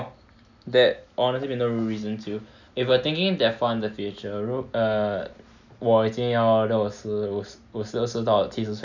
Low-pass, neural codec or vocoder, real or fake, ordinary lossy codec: 7.2 kHz; none; real; none